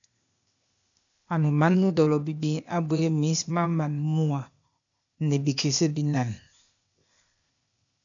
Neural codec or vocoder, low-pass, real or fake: codec, 16 kHz, 0.8 kbps, ZipCodec; 7.2 kHz; fake